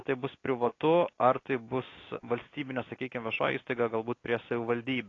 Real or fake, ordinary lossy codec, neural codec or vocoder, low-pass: real; AAC, 32 kbps; none; 7.2 kHz